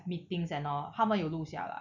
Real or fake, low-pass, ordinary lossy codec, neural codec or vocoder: real; 7.2 kHz; none; none